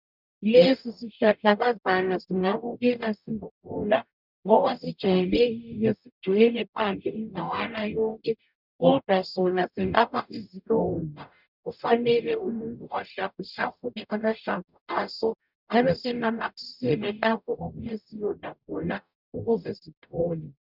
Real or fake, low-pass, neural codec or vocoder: fake; 5.4 kHz; codec, 44.1 kHz, 0.9 kbps, DAC